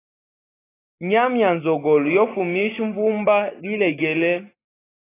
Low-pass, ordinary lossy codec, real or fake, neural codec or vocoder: 3.6 kHz; AAC, 16 kbps; real; none